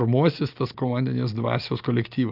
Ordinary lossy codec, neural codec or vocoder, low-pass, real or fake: Opus, 32 kbps; none; 5.4 kHz; real